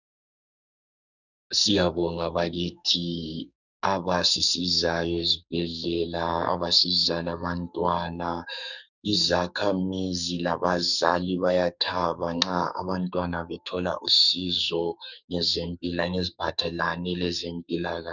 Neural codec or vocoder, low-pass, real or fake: codec, 44.1 kHz, 2.6 kbps, SNAC; 7.2 kHz; fake